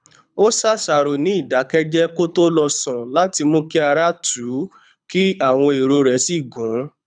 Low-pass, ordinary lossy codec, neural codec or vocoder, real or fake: 9.9 kHz; none; codec, 24 kHz, 6 kbps, HILCodec; fake